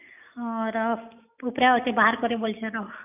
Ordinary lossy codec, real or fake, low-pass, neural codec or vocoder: none; real; 3.6 kHz; none